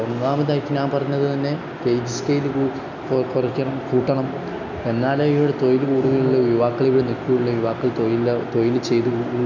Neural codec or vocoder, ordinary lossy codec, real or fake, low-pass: none; none; real; 7.2 kHz